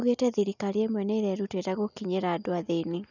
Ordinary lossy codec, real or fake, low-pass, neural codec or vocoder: none; real; 7.2 kHz; none